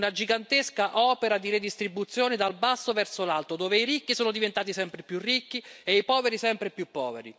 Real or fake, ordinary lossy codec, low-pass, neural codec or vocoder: real; none; none; none